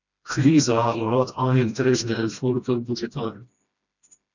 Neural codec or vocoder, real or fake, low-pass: codec, 16 kHz, 1 kbps, FreqCodec, smaller model; fake; 7.2 kHz